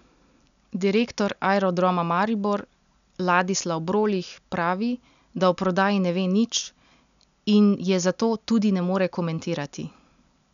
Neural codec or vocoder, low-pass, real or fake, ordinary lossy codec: none; 7.2 kHz; real; none